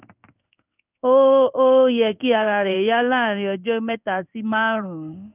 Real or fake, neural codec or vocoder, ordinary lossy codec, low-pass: fake; codec, 16 kHz in and 24 kHz out, 1 kbps, XY-Tokenizer; none; 3.6 kHz